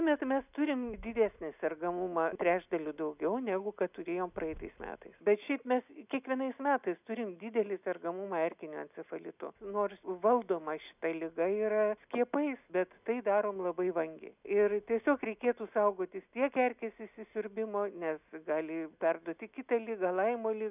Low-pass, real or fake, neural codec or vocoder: 3.6 kHz; real; none